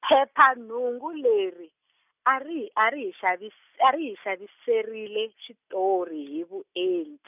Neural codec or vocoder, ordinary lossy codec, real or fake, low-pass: none; none; real; 3.6 kHz